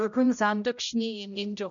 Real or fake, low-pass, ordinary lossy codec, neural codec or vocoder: fake; 7.2 kHz; none; codec, 16 kHz, 0.5 kbps, X-Codec, HuBERT features, trained on general audio